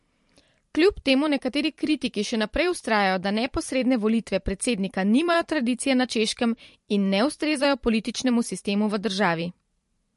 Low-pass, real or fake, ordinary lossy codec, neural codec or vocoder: 14.4 kHz; fake; MP3, 48 kbps; vocoder, 44.1 kHz, 128 mel bands every 512 samples, BigVGAN v2